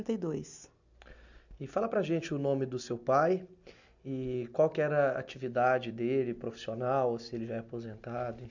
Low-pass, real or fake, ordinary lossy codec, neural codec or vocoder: 7.2 kHz; real; none; none